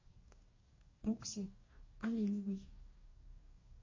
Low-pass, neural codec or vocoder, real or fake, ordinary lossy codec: 7.2 kHz; codec, 44.1 kHz, 2.6 kbps, DAC; fake; MP3, 32 kbps